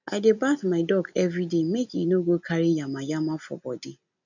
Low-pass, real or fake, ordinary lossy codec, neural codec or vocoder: 7.2 kHz; real; none; none